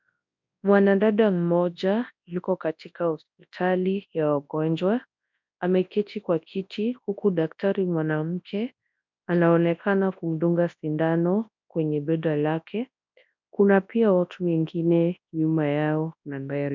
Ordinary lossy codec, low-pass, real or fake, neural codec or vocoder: MP3, 64 kbps; 7.2 kHz; fake; codec, 24 kHz, 0.9 kbps, WavTokenizer, large speech release